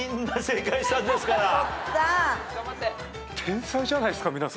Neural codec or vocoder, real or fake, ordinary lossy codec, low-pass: none; real; none; none